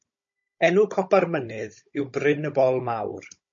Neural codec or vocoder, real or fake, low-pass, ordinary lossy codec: codec, 16 kHz, 16 kbps, FunCodec, trained on Chinese and English, 50 frames a second; fake; 7.2 kHz; MP3, 32 kbps